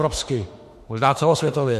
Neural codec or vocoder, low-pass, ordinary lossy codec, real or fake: autoencoder, 48 kHz, 32 numbers a frame, DAC-VAE, trained on Japanese speech; 14.4 kHz; MP3, 64 kbps; fake